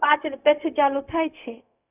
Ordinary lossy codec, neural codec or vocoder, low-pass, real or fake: none; codec, 16 kHz, 0.4 kbps, LongCat-Audio-Codec; 3.6 kHz; fake